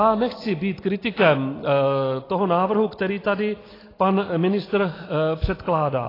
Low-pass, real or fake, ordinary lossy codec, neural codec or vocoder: 5.4 kHz; real; AAC, 24 kbps; none